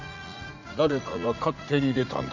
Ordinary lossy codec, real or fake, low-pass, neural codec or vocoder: none; fake; 7.2 kHz; vocoder, 44.1 kHz, 80 mel bands, Vocos